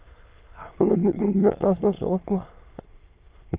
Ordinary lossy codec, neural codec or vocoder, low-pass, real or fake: Opus, 32 kbps; autoencoder, 22.05 kHz, a latent of 192 numbers a frame, VITS, trained on many speakers; 3.6 kHz; fake